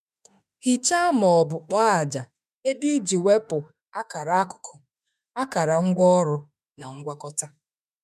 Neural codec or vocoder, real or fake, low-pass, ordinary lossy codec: autoencoder, 48 kHz, 32 numbers a frame, DAC-VAE, trained on Japanese speech; fake; 14.4 kHz; MP3, 96 kbps